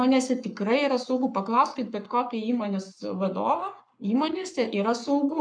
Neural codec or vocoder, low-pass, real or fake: codec, 44.1 kHz, 7.8 kbps, Pupu-Codec; 9.9 kHz; fake